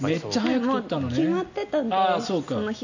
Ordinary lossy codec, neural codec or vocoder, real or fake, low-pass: none; none; real; 7.2 kHz